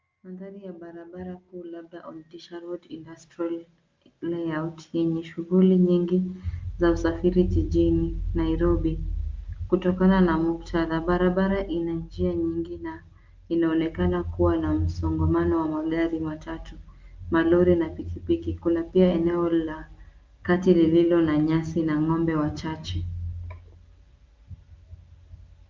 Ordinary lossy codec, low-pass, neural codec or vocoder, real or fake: Opus, 24 kbps; 7.2 kHz; none; real